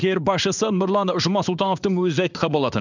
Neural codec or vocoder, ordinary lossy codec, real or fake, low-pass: codec, 16 kHz in and 24 kHz out, 1 kbps, XY-Tokenizer; none; fake; 7.2 kHz